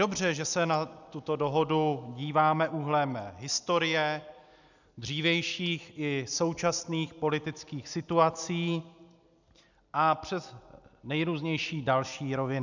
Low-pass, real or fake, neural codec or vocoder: 7.2 kHz; real; none